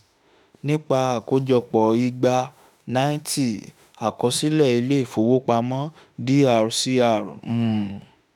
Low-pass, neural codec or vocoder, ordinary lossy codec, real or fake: 19.8 kHz; autoencoder, 48 kHz, 32 numbers a frame, DAC-VAE, trained on Japanese speech; none; fake